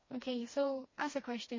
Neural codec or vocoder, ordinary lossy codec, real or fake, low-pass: codec, 16 kHz, 2 kbps, FreqCodec, smaller model; MP3, 32 kbps; fake; 7.2 kHz